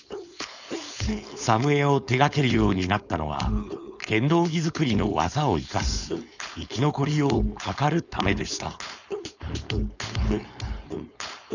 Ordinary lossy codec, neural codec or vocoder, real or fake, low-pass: none; codec, 16 kHz, 4.8 kbps, FACodec; fake; 7.2 kHz